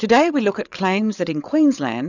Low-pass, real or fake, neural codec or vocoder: 7.2 kHz; fake; codec, 16 kHz, 8 kbps, FreqCodec, larger model